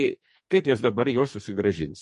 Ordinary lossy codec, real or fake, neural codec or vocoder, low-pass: MP3, 48 kbps; fake; codec, 44.1 kHz, 2.6 kbps, SNAC; 14.4 kHz